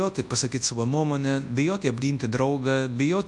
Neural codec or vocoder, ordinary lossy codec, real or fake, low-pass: codec, 24 kHz, 0.9 kbps, WavTokenizer, large speech release; AAC, 64 kbps; fake; 10.8 kHz